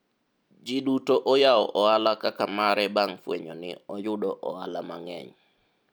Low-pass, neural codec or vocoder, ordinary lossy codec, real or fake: none; none; none; real